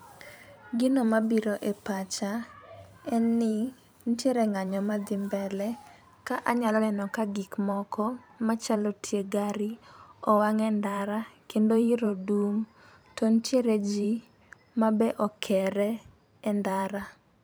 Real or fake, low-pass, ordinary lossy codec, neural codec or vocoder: fake; none; none; vocoder, 44.1 kHz, 128 mel bands every 512 samples, BigVGAN v2